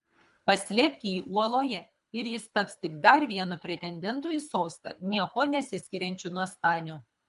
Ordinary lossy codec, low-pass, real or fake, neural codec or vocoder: MP3, 64 kbps; 10.8 kHz; fake; codec, 24 kHz, 3 kbps, HILCodec